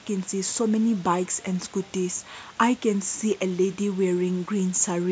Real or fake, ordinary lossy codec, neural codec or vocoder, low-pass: real; none; none; none